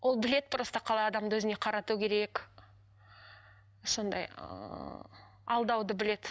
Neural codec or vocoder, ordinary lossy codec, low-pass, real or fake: none; none; none; real